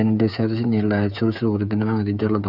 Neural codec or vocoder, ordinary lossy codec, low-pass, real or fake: codec, 16 kHz, 8 kbps, FreqCodec, smaller model; Opus, 64 kbps; 5.4 kHz; fake